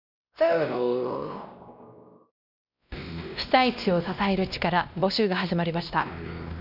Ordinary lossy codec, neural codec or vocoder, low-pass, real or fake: none; codec, 16 kHz, 1 kbps, X-Codec, WavLM features, trained on Multilingual LibriSpeech; 5.4 kHz; fake